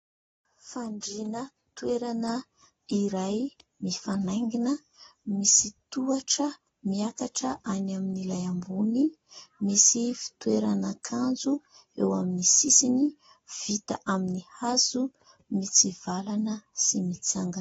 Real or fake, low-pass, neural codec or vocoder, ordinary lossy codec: real; 14.4 kHz; none; AAC, 24 kbps